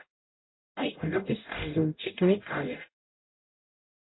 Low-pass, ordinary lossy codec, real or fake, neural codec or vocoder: 7.2 kHz; AAC, 16 kbps; fake; codec, 44.1 kHz, 0.9 kbps, DAC